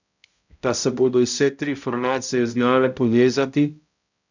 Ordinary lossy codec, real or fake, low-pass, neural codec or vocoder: none; fake; 7.2 kHz; codec, 16 kHz, 0.5 kbps, X-Codec, HuBERT features, trained on balanced general audio